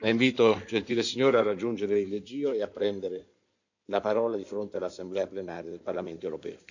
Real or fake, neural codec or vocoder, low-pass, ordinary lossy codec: fake; codec, 16 kHz in and 24 kHz out, 2.2 kbps, FireRedTTS-2 codec; 7.2 kHz; none